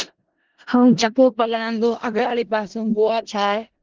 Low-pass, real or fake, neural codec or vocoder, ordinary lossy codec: 7.2 kHz; fake; codec, 16 kHz in and 24 kHz out, 0.4 kbps, LongCat-Audio-Codec, four codebook decoder; Opus, 16 kbps